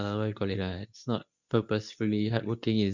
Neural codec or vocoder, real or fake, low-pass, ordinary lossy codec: codec, 24 kHz, 0.9 kbps, WavTokenizer, medium speech release version 2; fake; 7.2 kHz; none